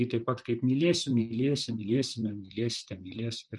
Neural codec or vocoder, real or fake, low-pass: vocoder, 44.1 kHz, 128 mel bands, Pupu-Vocoder; fake; 10.8 kHz